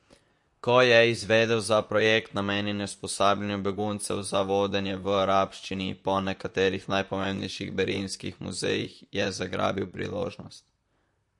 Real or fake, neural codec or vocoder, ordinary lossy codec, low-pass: fake; vocoder, 44.1 kHz, 128 mel bands, Pupu-Vocoder; MP3, 48 kbps; 10.8 kHz